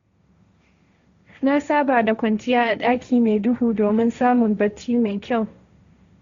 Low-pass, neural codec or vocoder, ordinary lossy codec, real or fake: 7.2 kHz; codec, 16 kHz, 1.1 kbps, Voila-Tokenizer; Opus, 64 kbps; fake